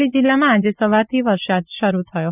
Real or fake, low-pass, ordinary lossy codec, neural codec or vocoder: real; 3.6 kHz; none; none